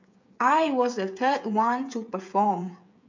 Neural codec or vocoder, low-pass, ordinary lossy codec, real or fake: codec, 16 kHz, 8 kbps, FreqCodec, smaller model; 7.2 kHz; MP3, 64 kbps; fake